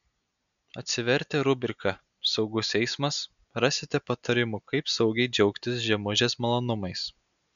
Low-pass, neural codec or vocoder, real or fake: 7.2 kHz; none; real